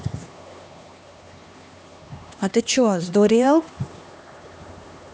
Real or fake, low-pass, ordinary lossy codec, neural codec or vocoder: fake; none; none; codec, 16 kHz, 2 kbps, X-Codec, HuBERT features, trained on LibriSpeech